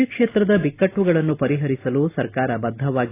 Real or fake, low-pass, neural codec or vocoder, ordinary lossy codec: real; 3.6 kHz; none; AAC, 24 kbps